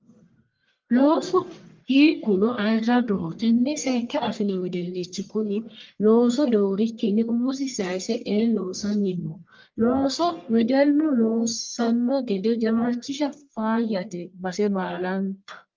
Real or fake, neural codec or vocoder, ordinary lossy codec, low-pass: fake; codec, 44.1 kHz, 1.7 kbps, Pupu-Codec; Opus, 24 kbps; 7.2 kHz